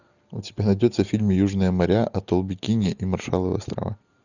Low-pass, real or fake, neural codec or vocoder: 7.2 kHz; real; none